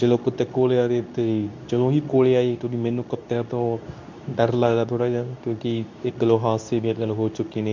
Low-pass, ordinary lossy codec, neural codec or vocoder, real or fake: 7.2 kHz; none; codec, 24 kHz, 0.9 kbps, WavTokenizer, medium speech release version 2; fake